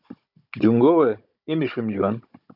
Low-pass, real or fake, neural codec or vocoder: 5.4 kHz; fake; codec, 16 kHz, 16 kbps, FunCodec, trained on Chinese and English, 50 frames a second